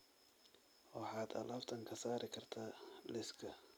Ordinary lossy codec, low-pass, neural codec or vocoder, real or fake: none; none; none; real